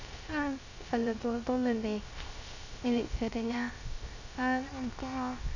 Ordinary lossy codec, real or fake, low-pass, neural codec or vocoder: none; fake; 7.2 kHz; codec, 16 kHz, 0.3 kbps, FocalCodec